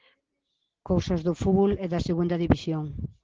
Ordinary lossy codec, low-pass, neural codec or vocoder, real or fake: Opus, 16 kbps; 7.2 kHz; none; real